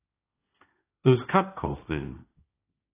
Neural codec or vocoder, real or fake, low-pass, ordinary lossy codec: codec, 16 kHz, 1.1 kbps, Voila-Tokenizer; fake; 3.6 kHz; AAC, 24 kbps